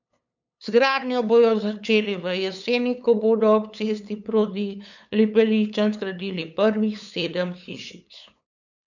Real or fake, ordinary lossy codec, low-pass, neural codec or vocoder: fake; none; 7.2 kHz; codec, 16 kHz, 8 kbps, FunCodec, trained on LibriTTS, 25 frames a second